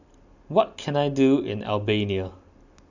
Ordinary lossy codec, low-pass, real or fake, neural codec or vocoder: none; 7.2 kHz; real; none